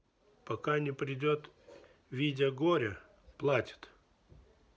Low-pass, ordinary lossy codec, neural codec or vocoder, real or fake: none; none; none; real